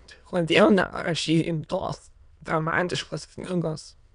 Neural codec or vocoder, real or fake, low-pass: autoencoder, 22.05 kHz, a latent of 192 numbers a frame, VITS, trained on many speakers; fake; 9.9 kHz